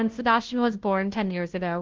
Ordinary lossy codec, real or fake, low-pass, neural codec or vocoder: Opus, 16 kbps; fake; 7.2 kHz; codec, 16 kHz, 0.5 kbps, FunCodec, trained on Chinese and English, 25 frames a second